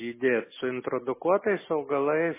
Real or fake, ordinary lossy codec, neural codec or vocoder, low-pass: real; MP3, 16 kbps; none; 3.6 kHz